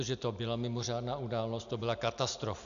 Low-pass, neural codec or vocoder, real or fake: 7.2 kHz; none; real